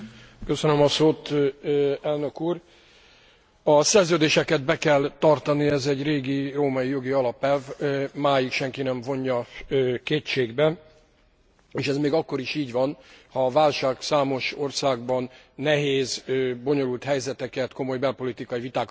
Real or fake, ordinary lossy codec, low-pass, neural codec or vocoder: real; none; none; none